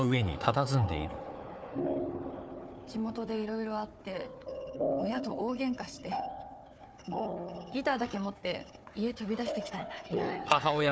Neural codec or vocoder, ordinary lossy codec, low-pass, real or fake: codec, 16 kHz, 4 kbps, FunCodec, trained on Chinese and English, 50 frames a second; none; none; fake